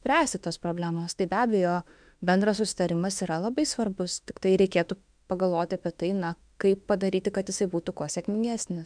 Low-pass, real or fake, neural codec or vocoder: 9.9 kHz; fake; autoencoder, 48 kHz, 32 numbers a frame, DAC-VAE, trained on Japanese speech